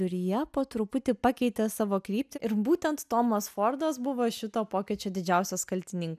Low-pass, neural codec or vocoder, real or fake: 14.4 kHz; none; real